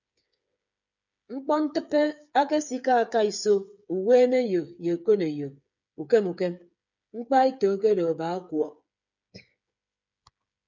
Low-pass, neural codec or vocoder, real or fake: 7.2 kHz; codec, 16 kHz, 8 kbps, FreqCodec, smaller model; fake